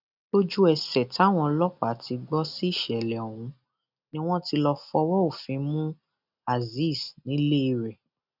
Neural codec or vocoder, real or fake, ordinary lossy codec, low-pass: none; real; none; 5.4 kHz